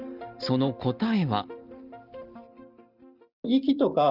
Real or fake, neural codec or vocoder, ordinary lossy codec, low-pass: real; none; Opus, 32 kbps; 5.4 kHz